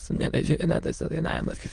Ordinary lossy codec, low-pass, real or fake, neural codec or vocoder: Opus, 24 kbps; 9.9 kHz; fake; autoencoder, 22.05 kHz, a latent of 192 numbers a frame, VITS, trained on many speakers